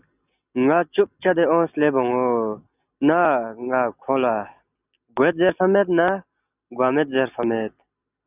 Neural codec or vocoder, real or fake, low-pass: none; real; 3.6 kHz